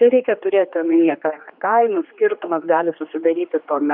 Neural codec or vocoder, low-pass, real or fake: codec, 16 kHz, 2 kbps, X-Codec, HuBERT features, trained on general audio; 5.4 kHz; fake